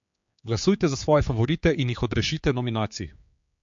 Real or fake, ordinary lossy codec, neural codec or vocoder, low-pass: fake; MP3, 48 kbps; codec, 16 kHz, 4 kbps, X-Codec, HuBERT features, trained on general audio; 7.2 kHz